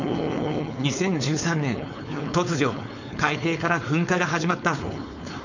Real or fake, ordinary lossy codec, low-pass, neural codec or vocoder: fake; none; 7.2 kHz; codec, 16 kHz, 4.8 kbps, FACodec